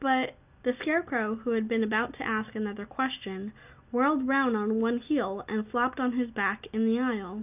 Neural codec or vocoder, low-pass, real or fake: none; 3.6 kHz; real